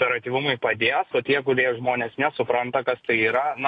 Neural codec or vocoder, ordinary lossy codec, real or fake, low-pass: none; AAC, 48 kbps; real; 9.9 kHz